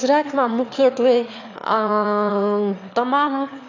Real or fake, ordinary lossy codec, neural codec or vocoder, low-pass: fake; AAC, 48 kbps; autoencoder, 22.05 kHz, a latent of 192 numbers a frame, VITS, trained on one speaker; 7.2 kHz